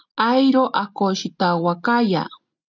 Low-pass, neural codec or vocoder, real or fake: 7.2 kHz; none; real